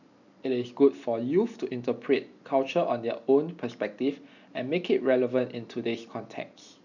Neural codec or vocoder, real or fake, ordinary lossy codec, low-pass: none; real; none; 7.2 kHz